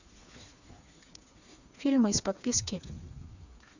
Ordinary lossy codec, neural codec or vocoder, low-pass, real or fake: none; codec, 16 kHz, 4 kbps, FreqCodec, smaller model; 7.2 kHz; fake